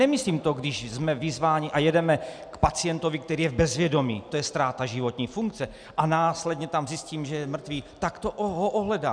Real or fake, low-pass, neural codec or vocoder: real; 9.9 kHz; none